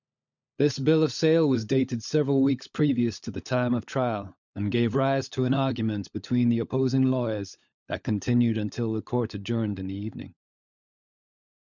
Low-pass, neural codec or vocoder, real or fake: 7.2 kHz; codec, 16 kHz, 16 kbps, FunCodec, trained on LibriTTS, 50 frames a second; fake